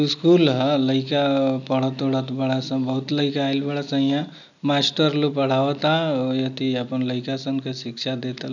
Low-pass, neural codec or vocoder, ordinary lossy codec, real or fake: 7.2 kHz; none; none; real